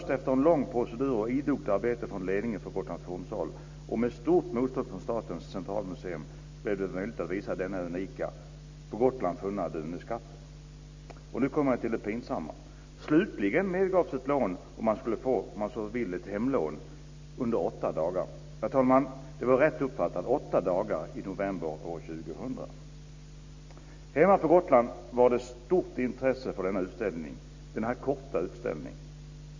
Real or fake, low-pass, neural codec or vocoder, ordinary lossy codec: real; 7.2 kHz; none; MP3, 48 kbps